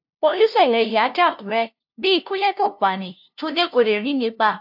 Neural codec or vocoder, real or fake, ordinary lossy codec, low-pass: codec, 16 kHz, 0.5 kbps, FunCodec, trained on LibriTTS, 25 frames a second; fake; AAC, 48 kbps; 5.4 kHz